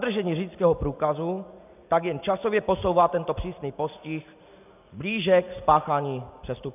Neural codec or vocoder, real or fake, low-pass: none; real; 3.6 kHz